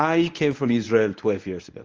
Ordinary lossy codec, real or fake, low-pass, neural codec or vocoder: Opus, 24 kbps; fake; 7.2 kHz; codec, 24 kHz, 0.9 kbps, WavTokenizer, medium speech release version 1